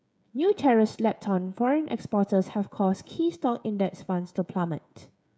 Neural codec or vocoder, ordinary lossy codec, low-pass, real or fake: codec, 16 kHz, 16 kbps, FreqCodec, smaller model; none; none; fake